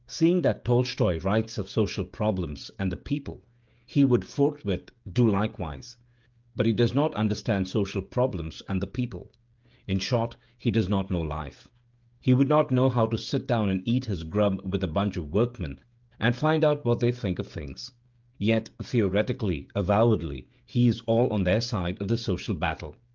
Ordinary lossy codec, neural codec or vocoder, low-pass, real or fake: Opus, 24 kbps; codec, 16 kHz, 16 kbps, FreqCodec, smaller model; 7.2 kHz; fake